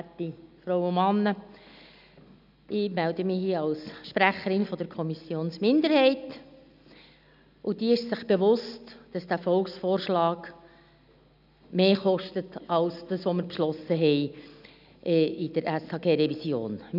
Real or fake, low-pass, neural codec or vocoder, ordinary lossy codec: real; 5.4 kHz; none; none